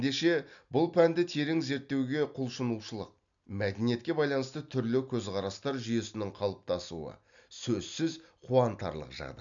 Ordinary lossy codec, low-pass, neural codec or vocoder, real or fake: MP3, 96 kbps; 7.2 kHz; none; real